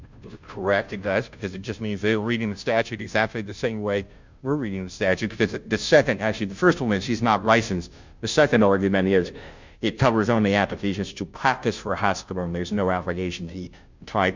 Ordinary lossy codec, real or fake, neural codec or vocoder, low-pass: MP3, 64 kbps; fake; codec, 16 kHz, 0.5 kbps, FunCodec, trained on Chinese and English, 25 frames a second; 7.2 kHz